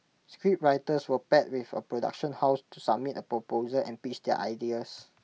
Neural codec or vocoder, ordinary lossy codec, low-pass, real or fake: none; none; none; real